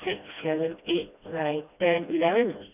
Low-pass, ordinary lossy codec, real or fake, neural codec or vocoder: 3.6 kHz; none; fake; codec, 16 kHz, 1 kbps, FreqCodec, smaller model